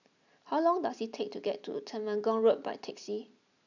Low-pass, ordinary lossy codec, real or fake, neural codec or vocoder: 7.2 kHz; none; real; none